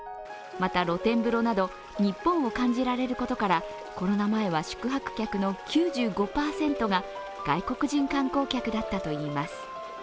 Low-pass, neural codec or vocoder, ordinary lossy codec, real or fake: none; none; none; real